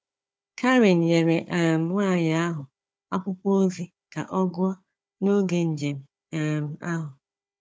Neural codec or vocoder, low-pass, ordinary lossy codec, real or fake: codec, 16 kHz, 4 kbps, FunCodec, trained on Chinese and English, 50 frames a second; none; none; fake